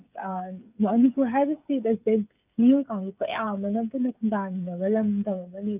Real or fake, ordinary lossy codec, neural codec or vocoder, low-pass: fake; none; codec, 16 kHz, 8 kbps, FreqCodec, smaller model; 3.6 kHz